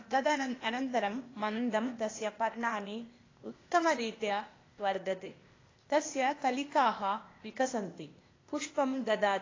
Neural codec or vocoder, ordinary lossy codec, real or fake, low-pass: codec, 16 kHz, 0.8 kbps, ZipCodec; AAC, 32 kbps; fake; 7.2 kHz